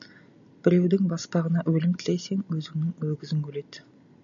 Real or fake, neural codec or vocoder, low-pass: real; none; 7.2 kHz